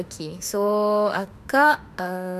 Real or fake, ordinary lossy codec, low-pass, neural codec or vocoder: fake; none; 14.4 kHz; autoencoder, 48 kHz, 32 numbers a frame, DAC-VAE, trained on Japanese speech